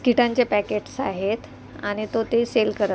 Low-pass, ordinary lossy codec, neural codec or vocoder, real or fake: none; none; none; real